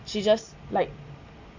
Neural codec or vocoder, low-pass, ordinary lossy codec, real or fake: none; 7.2 kHz; MP3, 64 kbps; real